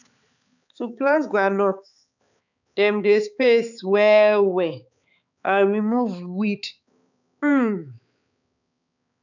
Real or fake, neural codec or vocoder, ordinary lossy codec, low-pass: fake; codec, 16 kHz, 4 kbps, X-Codec, HuBERT features, trained on balanced general audio; none; 7.2 kHz